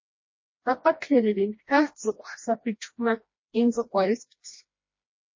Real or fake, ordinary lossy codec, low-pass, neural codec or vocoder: fake; MP3, 32 kbps; 7.2 kHz; codec, 16 kHz, 1 kbps, FreqCodec, smaller model